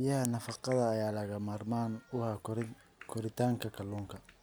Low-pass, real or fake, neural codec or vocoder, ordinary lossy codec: none; real; none; none